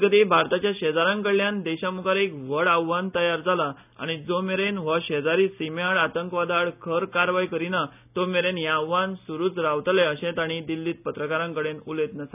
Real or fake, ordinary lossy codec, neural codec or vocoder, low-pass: real; none; none; 3.6 kHz